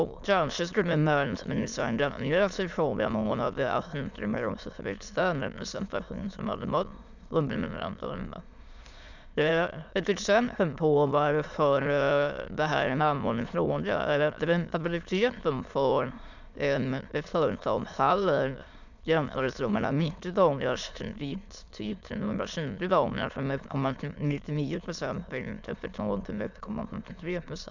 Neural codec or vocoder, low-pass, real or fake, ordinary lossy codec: autoencoder, 22.05 kHz, a latent of 192 numbers a frame, VITS, trained on many speakers; 7.2 kHz; fake; none